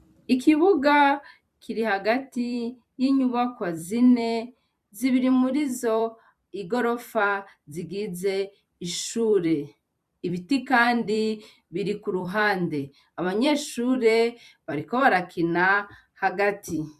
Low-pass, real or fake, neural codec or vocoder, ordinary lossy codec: 14.4 kHz; fake; vocoder, 44.1 kHz, 128 mel bands every 256 samples, BigVGAN v2; MP3, 96 kbps